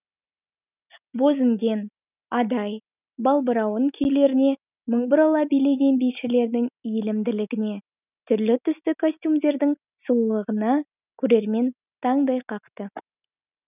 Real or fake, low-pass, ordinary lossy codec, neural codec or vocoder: real; 3.6 kHz; none; none